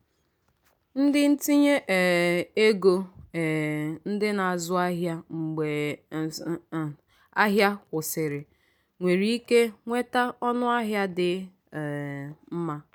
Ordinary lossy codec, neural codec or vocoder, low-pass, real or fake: none; none; none; real